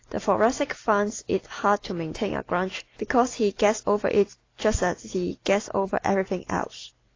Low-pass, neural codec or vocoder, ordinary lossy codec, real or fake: 7.2 kHz; none; AAC, 32 kbps; real